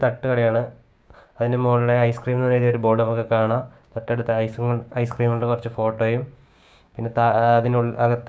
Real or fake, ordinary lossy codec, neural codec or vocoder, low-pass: fake; none; codec, 16 kHz, 6 kbps, DAC; none